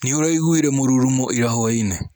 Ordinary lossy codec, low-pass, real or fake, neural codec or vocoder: none; none; real; none